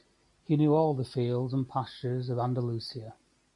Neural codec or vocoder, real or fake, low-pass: none; real; 10.8 kHz